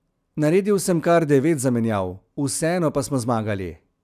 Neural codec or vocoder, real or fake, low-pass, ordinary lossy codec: none; real; 14.4 kHz; none